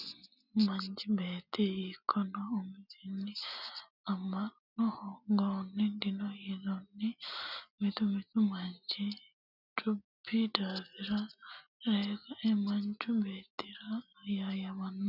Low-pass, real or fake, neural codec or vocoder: 5.4 kHz; real; none